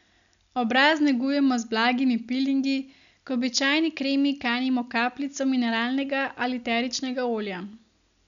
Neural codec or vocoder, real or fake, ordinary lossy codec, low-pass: none; real; none; 7.2 kHz